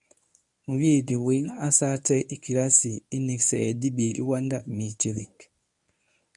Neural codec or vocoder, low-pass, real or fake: codec, 24 kHz, 0.9 kbps, WavTokenizer, medium speech release version 1; 10.8 kHz; fake